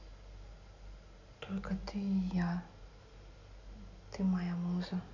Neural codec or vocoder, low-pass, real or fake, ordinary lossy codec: none; 7.2 kHz; real; none